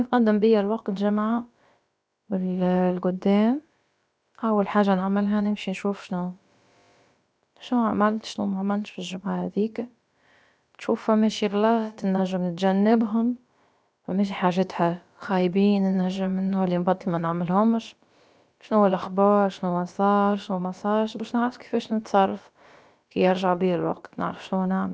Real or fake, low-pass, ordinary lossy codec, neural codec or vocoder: fake; none; none; codec, 16 kHz, about 1 kbps, DyCAST, with the encoder's durations